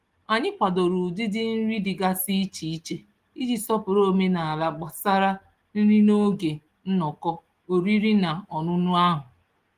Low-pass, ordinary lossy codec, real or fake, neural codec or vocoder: 14.4 kHz; Opus, 24 kbps; real; none